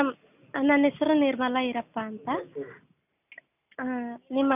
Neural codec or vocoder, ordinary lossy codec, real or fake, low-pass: none; none; real; 3.6 kHz